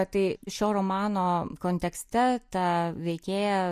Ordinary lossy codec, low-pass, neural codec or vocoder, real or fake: MP3, 64 kbps; 14.4 kHz; none; real